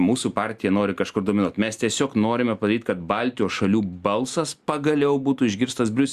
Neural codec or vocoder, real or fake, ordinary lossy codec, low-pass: none; real; Opus, 64 kbps; 14.4 kHz